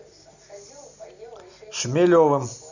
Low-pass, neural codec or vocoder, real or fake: 7.2 kHz; none; real